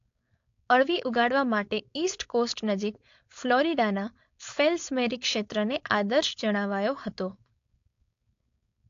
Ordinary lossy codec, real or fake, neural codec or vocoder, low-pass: AAC, 48 kbps; fake; codec, 16 kHz, 4.8 kbps, FACodec; 7.2 kHz